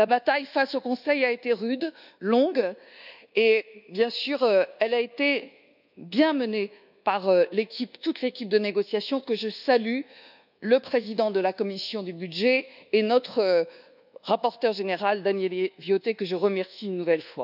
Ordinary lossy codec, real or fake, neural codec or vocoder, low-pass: none; fake; codec, 24 kHz, 1.2 kbps, DualCodec; 5.4 kHz